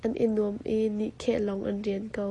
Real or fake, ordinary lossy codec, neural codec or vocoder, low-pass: real; AAC, 32 kbps; none; 10.8 kHz